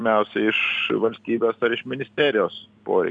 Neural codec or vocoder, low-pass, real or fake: none; 9.9 kHz; real